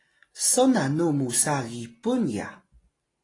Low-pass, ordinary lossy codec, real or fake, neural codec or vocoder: 10.8 kHz; AAC, 32 kbps; real; none